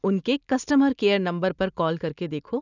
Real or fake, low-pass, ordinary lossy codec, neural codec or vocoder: real; 7.2 kHz; none; none